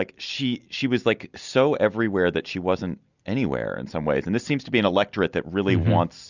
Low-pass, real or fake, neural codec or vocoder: 7.2 kHz; real; none